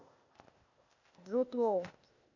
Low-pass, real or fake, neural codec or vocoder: 7.2 kHz; fake; codec, 16 kHz, 0.8 kbps, ZipCodec